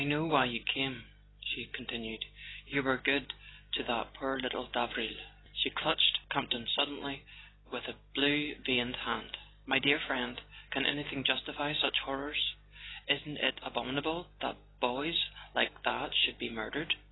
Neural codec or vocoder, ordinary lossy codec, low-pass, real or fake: none; AAC, 16 kbps; 7.2 kHz; real